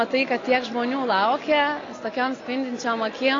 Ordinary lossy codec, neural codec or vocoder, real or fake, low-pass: AAC, 32 kbps; none; real; 7.2 kHz